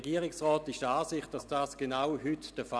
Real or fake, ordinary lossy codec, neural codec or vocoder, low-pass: real; none; none; none